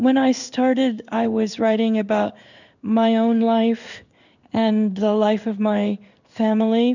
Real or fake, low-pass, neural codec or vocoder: fake; 7.2 kHz; codec, 16 kHz in and 24 kHz out, 1 kbps, XY-Tokenizer